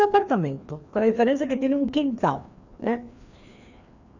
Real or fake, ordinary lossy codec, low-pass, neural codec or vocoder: fake; none; 7.2 kHz; codec, 16 kHz, 2 kbps, FreqCodec, larger model